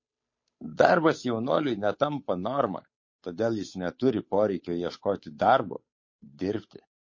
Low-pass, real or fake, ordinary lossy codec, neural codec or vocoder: 7.2 kHz; fake; MP3, 32 kbps; codec, 16 kHz, 8 kbps, FunCodec, trained on Chinese and English, 25 frames a second